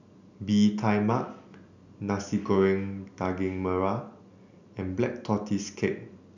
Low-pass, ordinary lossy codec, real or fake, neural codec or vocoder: 7.2 kHz; none; real; none